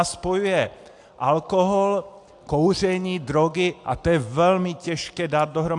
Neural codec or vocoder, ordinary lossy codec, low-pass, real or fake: none; AAC, 64 kbps; 10.8 kHz; real